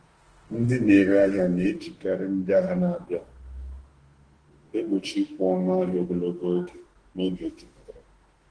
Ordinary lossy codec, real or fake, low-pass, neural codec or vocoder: Opus, 16 kbps; fake; 9.9 kHz; autoencoder, 48 kHz, 32 numbers a frame, DAC-VAE, trained on Japanese speech